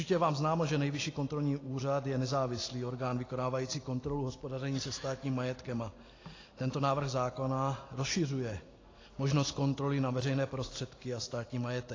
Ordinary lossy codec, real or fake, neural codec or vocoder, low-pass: AAC, 32 kbps; real; none; 7.2 kHz